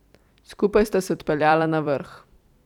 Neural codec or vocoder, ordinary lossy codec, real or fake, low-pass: vocoder, 44.1 kHz, 128 mel bands every 256 samples, BigVGAN v2; none; fake; 19.8 kHz